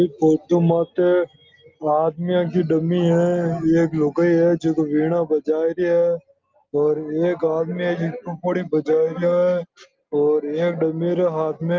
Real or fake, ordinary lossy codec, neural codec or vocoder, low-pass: real; Opus, 32 kbps; none; 7.2 kHz